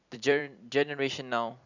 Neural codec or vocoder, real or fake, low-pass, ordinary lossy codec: vocoder, 44.1 kHz, 128 mel bands every 256 samples, BigVGAN v2; fake; 7.2 kHz; none